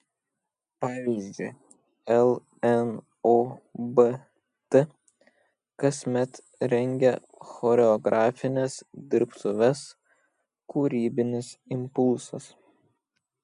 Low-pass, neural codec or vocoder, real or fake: 10.8 kHz; none; real